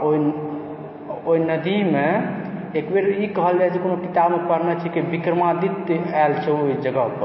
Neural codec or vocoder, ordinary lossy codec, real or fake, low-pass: none; MP3, 24 kbps; real; 7.2 kHz